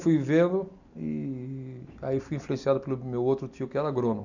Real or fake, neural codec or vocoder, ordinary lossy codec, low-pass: real; none; none; 7.2 kHz